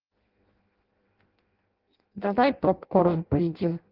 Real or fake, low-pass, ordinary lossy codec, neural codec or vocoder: fake; 5.4 kHz; Opus, 16 kbps; codec, 16 kHz in and 24 kHz out, 0.6 kbps, FireRedTTS-2 codec